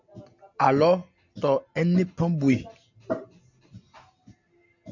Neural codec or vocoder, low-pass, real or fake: none; 7.2 kHz; real